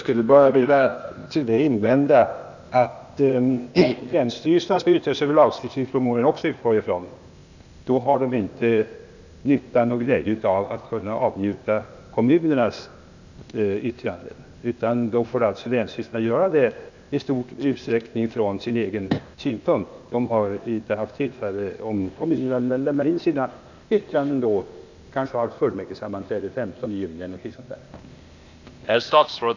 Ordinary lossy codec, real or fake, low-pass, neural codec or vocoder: none; fake; 7.2 kHz; codec, 16 kHz, 0.8 kbps, ZipCodec